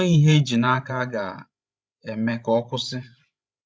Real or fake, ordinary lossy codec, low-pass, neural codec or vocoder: fake; none; none; codec, 16 kHz, 16 kbps, FreqCodec, larger model